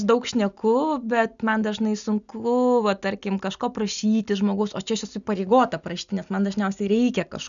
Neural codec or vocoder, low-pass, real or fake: none; 7.2 kHz; real